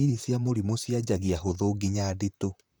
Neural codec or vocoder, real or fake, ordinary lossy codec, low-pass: vocoder, 44.1 kHz, 128 mel bands, Pupu-Vocoder; fake; none; none